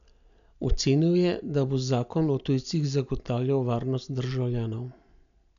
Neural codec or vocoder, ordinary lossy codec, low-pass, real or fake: none; none; 7.2 kHz; real